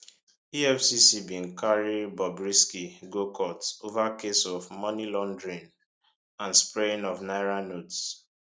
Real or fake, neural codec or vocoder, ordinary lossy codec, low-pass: real; none; none; none